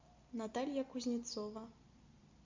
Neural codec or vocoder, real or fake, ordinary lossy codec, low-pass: none; real; MP3, 64 kbps; 7.2 kHz